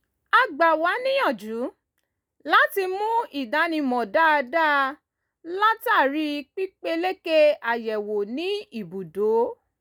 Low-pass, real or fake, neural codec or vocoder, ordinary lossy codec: none; real; none; none